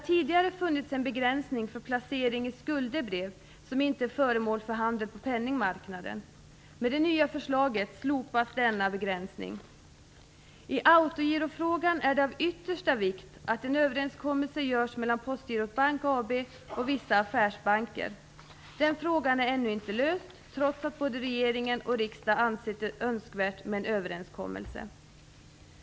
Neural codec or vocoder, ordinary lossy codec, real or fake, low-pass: none; none; real; none